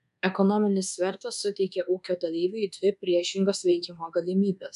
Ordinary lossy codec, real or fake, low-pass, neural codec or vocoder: MP3, 96 kbps; fake; 10.8 kHz; codec, 24 kHz, 1.2 kbps, DualCodec